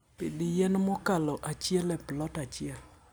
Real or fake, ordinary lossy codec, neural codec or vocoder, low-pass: real; none; none; none